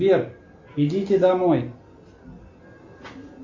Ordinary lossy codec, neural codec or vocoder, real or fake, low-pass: MP3, 48 kbps; none; real; 7.2 kHz